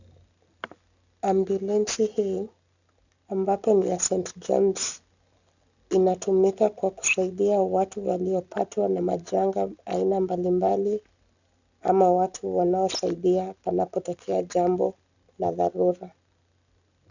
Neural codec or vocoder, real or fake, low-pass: none; real; 7.2 kHz